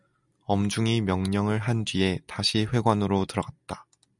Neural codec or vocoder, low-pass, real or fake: none; 10.8 kHz; real